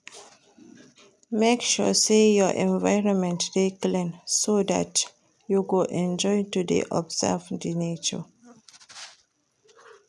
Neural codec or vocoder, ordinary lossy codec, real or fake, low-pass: none; none; real; none